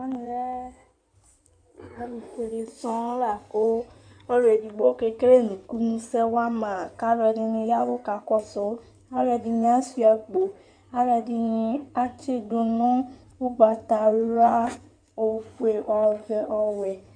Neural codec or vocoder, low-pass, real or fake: codec, 16 kHz in and 24 kHz out, 2.2 kbps, FireRedTTS-2 codec; 9.9 kHz; fake